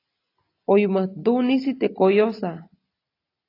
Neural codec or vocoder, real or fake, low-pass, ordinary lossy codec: none; real; 5.4 kHz; AAC, 32 kbps